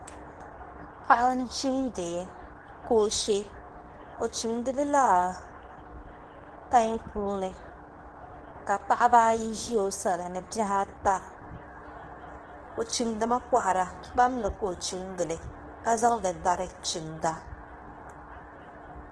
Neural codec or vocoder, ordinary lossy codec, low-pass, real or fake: codec, 24 kHz, 0.9 kbps, WavTokenizer, medium speech release version 2; Opus, 16 kbps; 10.8 kHz; fake